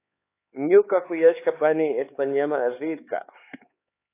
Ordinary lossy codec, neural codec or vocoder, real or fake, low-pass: AAC, 24 kbps; codec, 16 kHz, 4 kbps, X-Codec, HuBERT features, trained on LibriSpeech; fake; 3.6 kHz